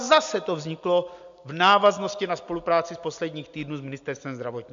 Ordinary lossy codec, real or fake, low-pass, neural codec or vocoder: MP3, 64 kbps; real; 7.2 kHz; none